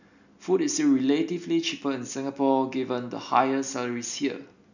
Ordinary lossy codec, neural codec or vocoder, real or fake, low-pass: none; none; real; 7.2 kHz